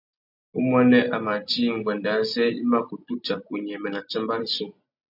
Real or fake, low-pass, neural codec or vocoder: real; 5.4 kHz; none